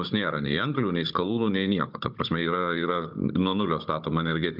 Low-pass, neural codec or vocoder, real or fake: 5.4 kHz; codec, 16 kHz, 16 kbps, FunCodec, trained on Chinese and English, 50 frames a second; fake